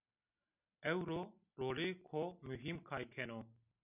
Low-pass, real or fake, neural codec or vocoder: 3.6 kHz; real; none